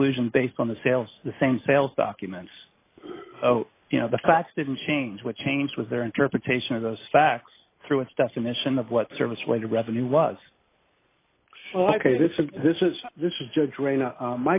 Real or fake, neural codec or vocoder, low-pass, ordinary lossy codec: real; none; 3.6 kHz; AAC, 24 kbps